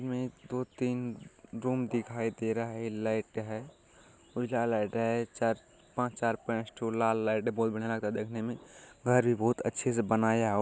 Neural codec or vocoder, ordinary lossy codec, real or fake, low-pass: none; none; real; none